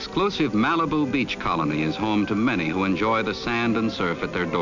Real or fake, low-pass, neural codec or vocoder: real; 7.2 kHz; none